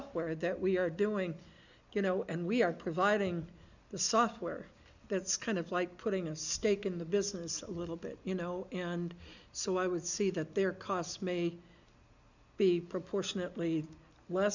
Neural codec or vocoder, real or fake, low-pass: none; real; 7.2 kHz